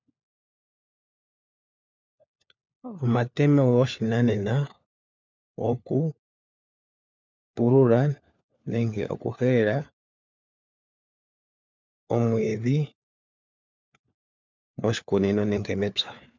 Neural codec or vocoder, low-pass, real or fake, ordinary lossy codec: codec, 16 kHz, 4 kbps, FunCodec, trained on LibriTTS, 50 frames a second; 7.2 kHz; fake; MP3, 64 kbps